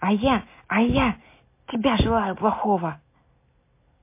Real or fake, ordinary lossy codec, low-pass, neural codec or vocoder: real; MP3, 24 kbps; 3.6 kHz; none